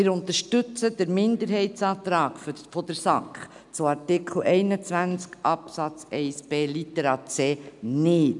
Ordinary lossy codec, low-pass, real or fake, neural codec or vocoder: none; 10.8 kHz; real; none